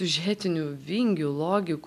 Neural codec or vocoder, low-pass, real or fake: none; 14.4 kHz; real